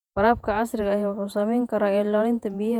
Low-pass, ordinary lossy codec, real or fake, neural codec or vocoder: 19.8 kHz; none; fake; vocoder, 48 kHz, 128 mel bands, Vocos